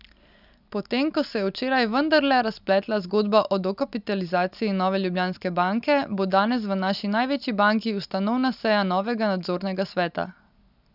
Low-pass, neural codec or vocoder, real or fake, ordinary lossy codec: 5.4 kHz; none; real; none